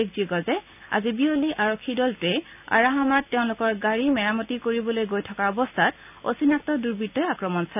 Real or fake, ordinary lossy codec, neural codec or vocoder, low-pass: real; none; none; 3.6 kHz